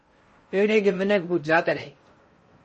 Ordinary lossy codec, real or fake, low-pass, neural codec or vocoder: MP3, 32 kbps; fake; 10.8 kHz; codec, 16 kHz in and 24 kHz out, 0.6 kbps, FocalCodec, streaming, 4096 codes